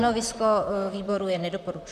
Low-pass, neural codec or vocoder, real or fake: 14.4 kHz; vocoder, 44.1 kHz, 128 mel bands, Pupu-Vocoder; fake